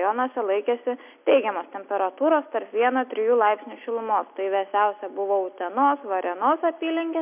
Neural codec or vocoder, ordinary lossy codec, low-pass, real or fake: none; MP3, 32 kbps; 3.6 kHz; real